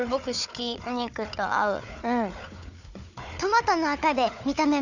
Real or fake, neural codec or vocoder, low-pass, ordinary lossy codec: fake; codec, 16 kHz, 4 kbps, FunCodec, trained on Chinese and English, 50 frames a second; 7.2 kHz; none